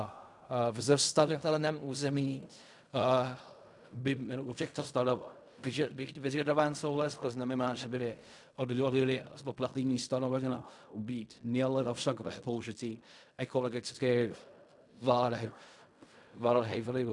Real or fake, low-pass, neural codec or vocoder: fake; 10.8 kHz; codec, 16 kHz in and 24 kHz out, 0.4 kbps, LongCat-Audio-Codec, fine tuned four codebook decoder